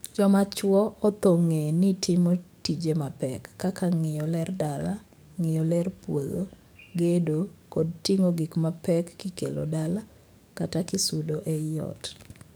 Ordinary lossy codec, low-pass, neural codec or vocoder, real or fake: none; none; codec, 44.1 kHz, 7.8 kbps, DAC; fake